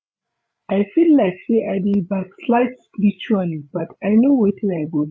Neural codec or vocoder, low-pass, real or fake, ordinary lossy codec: codec, 16 kHz, 8 kbps, FreqCodec, larger model; none; fake; none